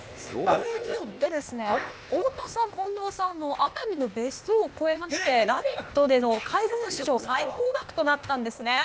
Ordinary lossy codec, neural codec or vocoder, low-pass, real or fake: none; codec, 16 kHz, 0.8 kbps, ZipCodec; none; fake